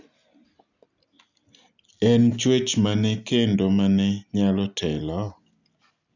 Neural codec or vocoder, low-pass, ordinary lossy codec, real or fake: none; 7.2 kHz; none; real